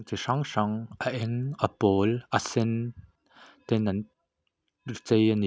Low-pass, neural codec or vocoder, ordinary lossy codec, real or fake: none; none; none; real